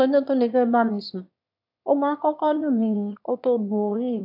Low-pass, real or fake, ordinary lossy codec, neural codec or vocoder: 5.4 kHz; fake; AAC, 48 kbps; autoencoder, 22.05 kHz, a latent of 192 numbers a frame, VITS, trained on one speaker